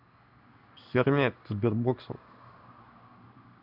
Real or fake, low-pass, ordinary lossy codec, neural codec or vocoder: fake; 5.4 kHz; none; codec, 16 kHz in and 24 kHz out, 1 kbps, XY-Tokenizer